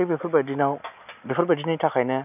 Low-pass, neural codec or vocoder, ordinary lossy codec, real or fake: 3.6 kHz; none; none; real